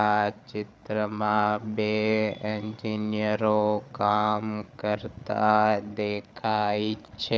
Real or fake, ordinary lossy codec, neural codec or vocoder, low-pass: fake; none; codec, 16 kHz, 8 kbps, FreqCodec, larger model; none